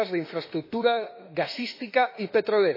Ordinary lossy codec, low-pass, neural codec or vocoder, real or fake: MP3, 24 kbps; 5.4 kHz; autoencoder, 48 kHz, 32 numbers a frame, DAC-VAE, trained on Japanese speech; fake